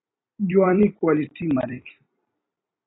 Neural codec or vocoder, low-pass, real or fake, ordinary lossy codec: vocoder, 44.1 kHz, 128 mel bands every 256 samples, BigVGAN v2; 7.2 kHz; fake; AAC, 16 kbps